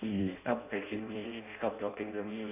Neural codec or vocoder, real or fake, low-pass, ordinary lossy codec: codec, 16 kHz in and 24 kHz out, 0.6 kbps, FireRedTTS-2 codec; fake; 3.6 kHz; none